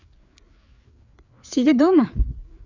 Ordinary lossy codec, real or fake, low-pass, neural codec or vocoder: none; fake; 7.2 kHz; codec, 16 kHz, 4 kbps, FreqCodec, larger model